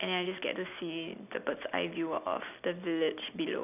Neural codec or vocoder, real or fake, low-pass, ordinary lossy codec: none; real; 3.6 kHz; none